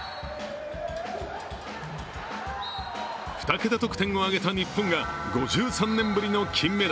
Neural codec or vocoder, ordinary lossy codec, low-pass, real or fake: none; none; none; real